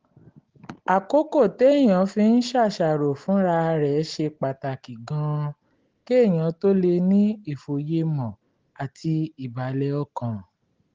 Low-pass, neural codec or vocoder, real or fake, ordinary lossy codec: 7.2 kHz; none; real; Opus, 16 kbps